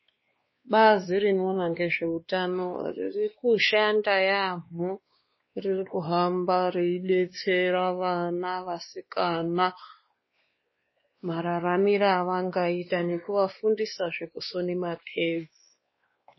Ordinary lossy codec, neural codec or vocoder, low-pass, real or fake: MP3, 24 kbps; codec, 16 kHz, 2 kbps, X-Codec, WavLM features, trained on Multilingual LibriSpeech; 7.2 kHz; fake